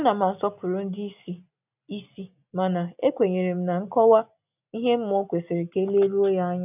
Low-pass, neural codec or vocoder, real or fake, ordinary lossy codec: 3.6 kHz; none; real; none